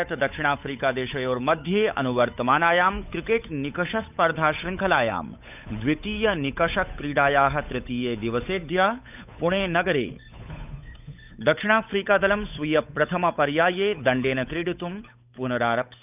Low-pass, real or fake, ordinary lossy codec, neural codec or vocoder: 3.6 kHz; fake; none; codec, 16 kHz, 8 kbps, FunCodec, trained on Chinese and English, 25 frames a second